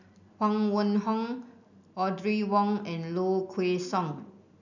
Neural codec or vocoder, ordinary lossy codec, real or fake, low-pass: none; none; real; 7.2 kHz